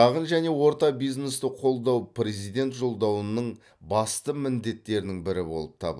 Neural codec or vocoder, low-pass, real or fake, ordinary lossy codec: none; none; real; none